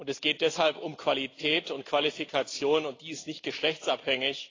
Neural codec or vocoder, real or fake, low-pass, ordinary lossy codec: none; real; 7.2 kHz; AAC, 32 kbps